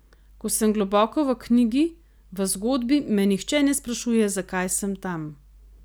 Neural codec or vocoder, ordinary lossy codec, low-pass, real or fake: none; none; none; real